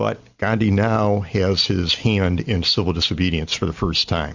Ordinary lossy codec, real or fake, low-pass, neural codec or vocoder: Opus, 64 kbps; real; 7.2 kHz; none